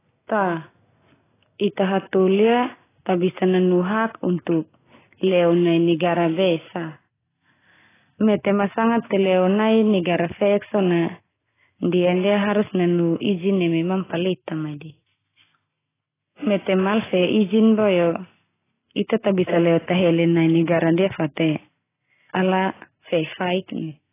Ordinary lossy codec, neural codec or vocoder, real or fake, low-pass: AAC, 16 kbps; none; real; 3.6 kHz